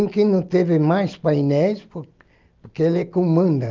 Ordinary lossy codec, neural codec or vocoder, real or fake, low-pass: Opus, 32 kbps; none; real; 7.2 kHz